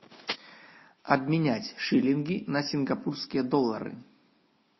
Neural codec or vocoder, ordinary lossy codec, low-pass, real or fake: none; MP3, 24 kbps; 7.2 kHz; real